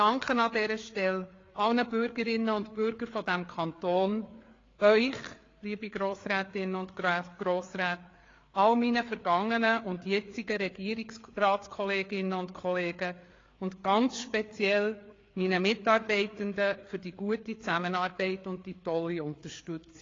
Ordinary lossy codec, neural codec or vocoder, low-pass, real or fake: AAC, 32 kbps; codec, 16 kHz, 4 kbps, FreqCodec, larger model; 7.2 kHz; fake